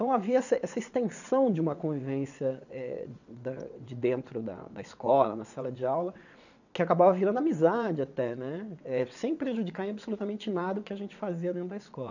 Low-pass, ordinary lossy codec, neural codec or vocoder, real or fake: 7.2 kHz; none; vocoder, 22.05 kHz, 80 mel bands, WaveNeXt; fake